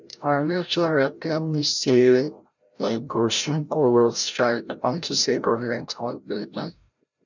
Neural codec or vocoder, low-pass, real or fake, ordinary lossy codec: codec, 16 kHz, 0.5 kbps, FreqCodec, larger model; 7.2 kHz; fake; none